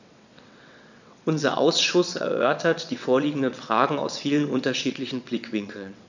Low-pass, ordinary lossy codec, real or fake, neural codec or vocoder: 7.2 kHz; none; fake; vocoder, 22.05 kHz, 80 mel bands, WaveNeXt